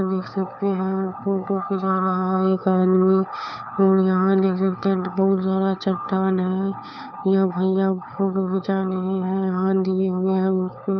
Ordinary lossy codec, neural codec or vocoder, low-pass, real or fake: none; codec, 16 kHz, 4 kbps, FunCodec, trained on LibriTTS, 50 frames a second; 7.2 kHz; fake